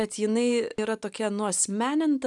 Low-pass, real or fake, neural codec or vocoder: 10.8 kHz; real; none